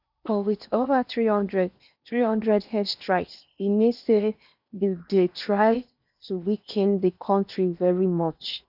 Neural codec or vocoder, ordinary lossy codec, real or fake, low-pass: codec, 16 kHz in and 24 kHz out, 0.8 kbps, FocalCodec, streaming, 65536 codes; none; fake; 5.4 kHz